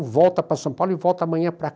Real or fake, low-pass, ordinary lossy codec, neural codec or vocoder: real; none; none; none